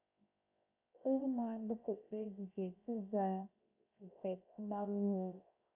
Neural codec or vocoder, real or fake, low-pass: codec, 16 kHz, 0.7 kbps, FocalCodec; fake; 3.6 kHz